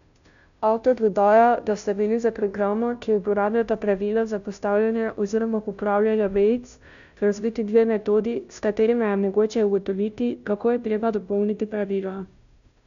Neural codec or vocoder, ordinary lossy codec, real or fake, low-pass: codec, 16 kHz, 0.5 kbps, FunCodec, trained on Chinese and English, 25 frames a second; none; fake; 7.2 kHz